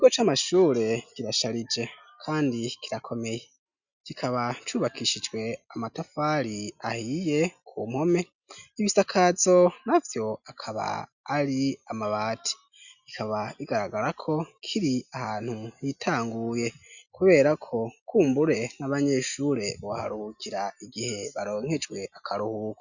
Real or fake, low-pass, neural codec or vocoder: real; 7.2 kHz; none